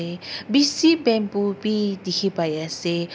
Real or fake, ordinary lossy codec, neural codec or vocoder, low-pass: real; none; none; none